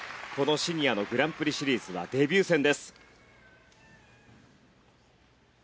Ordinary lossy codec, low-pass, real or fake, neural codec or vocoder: none; none; real; none